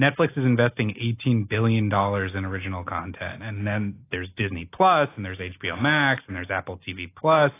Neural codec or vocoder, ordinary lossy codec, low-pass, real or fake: none; AAC, 24 kbps; 3.6 kHz; real